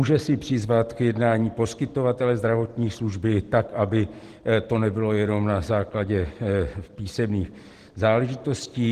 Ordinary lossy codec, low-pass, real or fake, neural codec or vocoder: Opus, 16 kbps; 14.4 kHz; real; none